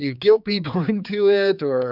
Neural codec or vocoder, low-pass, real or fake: codec, 16 kHz, 4 kbps, X-Codec, HuBERT features, trained on general audio; 5.4 kHz; fake